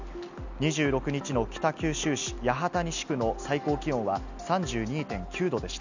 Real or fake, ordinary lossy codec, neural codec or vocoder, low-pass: real; none; none; 7.2 kHz